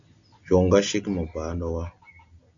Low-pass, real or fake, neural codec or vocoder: 7.2 kHz; real; none